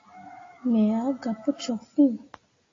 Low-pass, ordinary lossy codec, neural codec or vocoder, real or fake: 7.2 kHz; AAC, 32 kbps; none; real